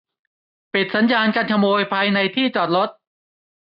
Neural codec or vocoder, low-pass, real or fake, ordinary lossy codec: none; 5.4 kHz; real; none